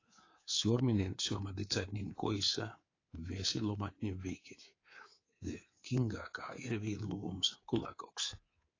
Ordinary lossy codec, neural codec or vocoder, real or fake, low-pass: AAC, 32 kbps; codec, 24 kHz, 3.1 kbps, DualCodec; fake; 7.2 kHz